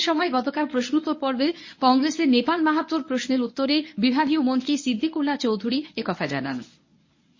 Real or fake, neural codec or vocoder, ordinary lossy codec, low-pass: fake; codec, 24 kHz, 0.9 kbps, WavTokenizer, medium speech release version 1; MP3, 32 kbps; 7.2 kHz